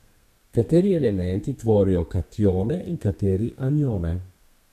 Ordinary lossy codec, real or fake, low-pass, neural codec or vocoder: none; fake; 14.4 kHz; codec, 32 kHz, 1.9 kbps, SNAC